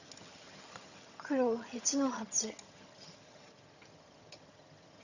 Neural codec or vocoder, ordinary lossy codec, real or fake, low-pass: vocoder, 22.05 kHz, 80 mel bands, HiFi-GAN; none; fake; 7.2 kHz